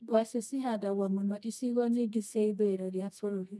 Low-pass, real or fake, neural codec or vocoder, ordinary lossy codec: none; fake; codec, 24 kHz, 0.9 kbps, WavTokenizer, medium music audio release; none